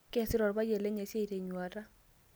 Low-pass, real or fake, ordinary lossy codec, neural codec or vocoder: none; real; none; none